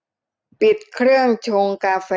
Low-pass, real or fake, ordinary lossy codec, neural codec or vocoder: none; real; none; none